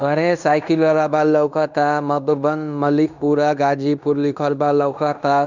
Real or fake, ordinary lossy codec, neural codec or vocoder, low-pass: fake; none; codec, 16 kHz in and 24 kHz out, 0.9 kbps, LongCat-Audio-Codec, fine tuned four codebook decoder; 7.2 kHz